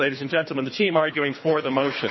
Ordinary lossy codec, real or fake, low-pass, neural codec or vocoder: MP3, 24 kbps; fake; 7.2 kHz; codec, 24 kHz, 3 kbps, HILCodec